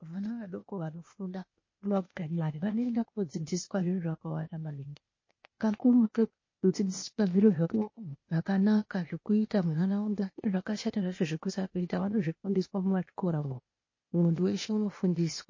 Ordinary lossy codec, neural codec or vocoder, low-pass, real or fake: MP3, 32 kbps; codec, 16 kHz, 0.8 kbps, ZipCodec; 7.2 kHz; fake